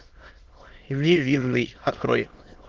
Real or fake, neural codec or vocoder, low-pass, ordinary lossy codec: fake; autoencoder, 22.05 kHz, a latent of 192 numbers a frame, VITS, trained on many speakers; 7.2 kHz; Opus, 16 kbps